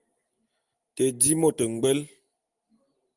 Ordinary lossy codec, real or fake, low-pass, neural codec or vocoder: Opus, 32 kbps; real; 10.8 kHz; none